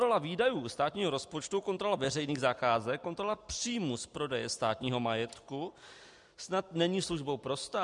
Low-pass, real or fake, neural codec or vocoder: 10.8 kHz; real; none